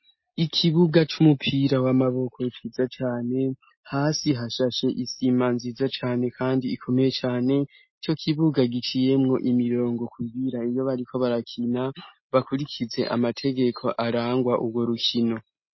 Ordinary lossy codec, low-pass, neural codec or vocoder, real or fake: MP3, 24 kbps; 7.2 kHz; none; real